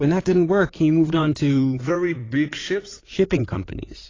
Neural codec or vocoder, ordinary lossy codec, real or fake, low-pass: codec, 16 kHz in and 24 kHz out, 2.2 kbps, FireRedTTS-2 codec; AAC, 32 kbps; fake; 7.2 kHz